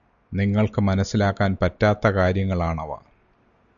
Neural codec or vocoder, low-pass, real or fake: none; 7.2 kHz; real